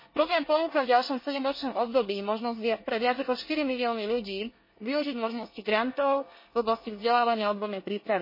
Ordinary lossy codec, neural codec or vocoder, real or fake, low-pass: MP3, 24 kbps; codec, 24 kHz, 1 kbps, SNAC; fake; 5.4 kHz